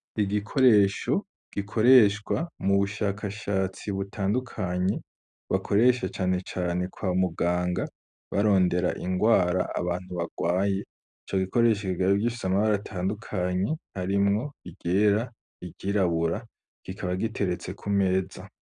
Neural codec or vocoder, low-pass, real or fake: none; 9.9 kHz; real